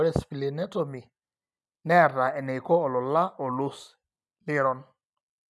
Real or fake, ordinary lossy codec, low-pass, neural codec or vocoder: real; none; none; none